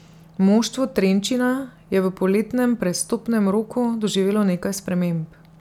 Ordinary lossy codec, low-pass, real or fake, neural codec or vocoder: none; 19.8 kHz; real; none